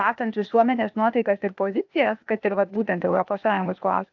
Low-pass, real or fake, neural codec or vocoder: 7.2 kHz; fake; codec, 16 kHz, 0.8 kbps, ZipCodec